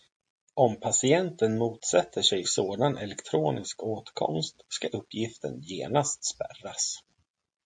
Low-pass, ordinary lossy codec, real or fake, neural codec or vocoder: 9.9 kHz; MP3, 48 kbps; real; none